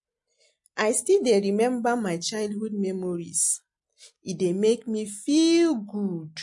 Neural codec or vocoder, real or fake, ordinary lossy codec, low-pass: none; real; MP3, 48 kbps; 10.8 kHz